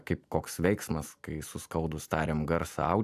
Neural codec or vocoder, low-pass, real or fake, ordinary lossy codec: none; 14.4 kHz; real; AAC, 96 kbps